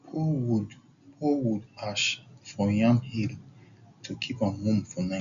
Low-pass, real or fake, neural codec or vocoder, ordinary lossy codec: 7.2 kHz; real; none; none